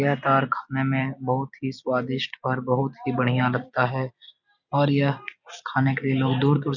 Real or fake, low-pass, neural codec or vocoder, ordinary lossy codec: real; 7.2 kHz; none; none